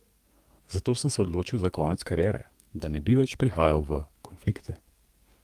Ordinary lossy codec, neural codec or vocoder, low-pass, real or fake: Opus, 32 kbps; codec, 32 kHz, 1.9 kbps, SNAC; 14.4 kHz; fake